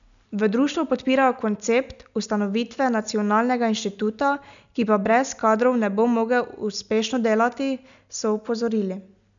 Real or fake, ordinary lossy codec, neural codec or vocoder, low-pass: real; none; none; 7.2 kHz